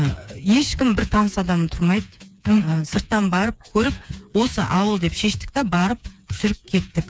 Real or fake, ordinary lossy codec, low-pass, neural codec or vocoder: fake; none; none; codec, 16 kHz, 4 kbps, FreqCodec, smaller model